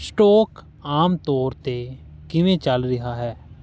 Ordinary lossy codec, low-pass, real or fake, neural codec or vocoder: none; none; real; none